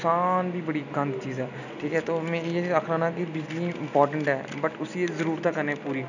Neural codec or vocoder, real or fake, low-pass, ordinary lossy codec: none; real; 7.2 kHz; none